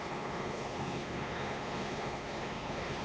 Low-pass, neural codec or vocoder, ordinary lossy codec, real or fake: none; codec, 16 kHz, 2 kbps, X-Codec, WavLM features, trained on Multilingual LibriSpeech; none; fake